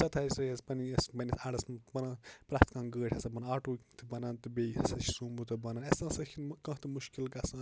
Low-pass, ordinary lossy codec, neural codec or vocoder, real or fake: none; none; none; real